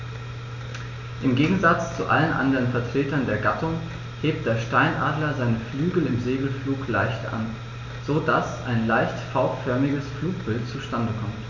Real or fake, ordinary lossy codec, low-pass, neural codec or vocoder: real; MP3, 64 kbps; 7.2 kHz; none